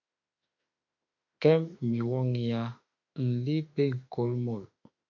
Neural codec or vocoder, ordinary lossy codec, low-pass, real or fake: autoencoder, 48 kHz, 32 numbers a frame, DAC-VAE, trained on Japanese speech; MP3, 64 kbps; 7.2 kHz; fake